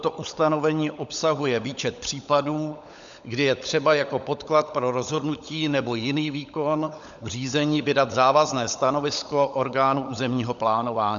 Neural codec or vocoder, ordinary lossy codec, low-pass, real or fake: codec, 16 kHz, 16 kbps, FunCodec, trained on LibriTTS, 50 frames a second; MP3, 96 kbps; 7.2 kHz; fake